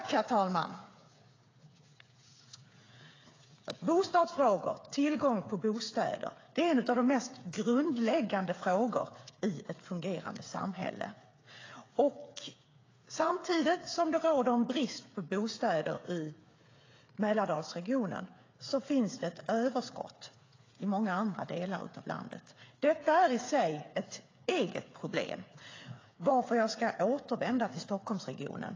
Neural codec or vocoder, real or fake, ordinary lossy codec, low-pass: codec, 16 kHz, 8 kbps, FreqCodec, smaller model; fake; AAC, 32 kbps; 7.2 kHz